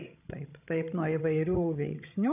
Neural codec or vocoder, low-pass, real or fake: codec, 16 kHz, 16 kbps, FreqCodec, larger model; 3.6 kHz; fake